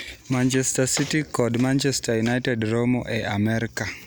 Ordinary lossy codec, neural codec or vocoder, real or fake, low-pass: none; none; real; none